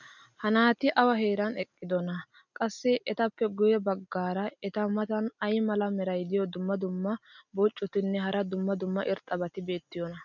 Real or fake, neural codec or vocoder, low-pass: real; none; 7.2 kHz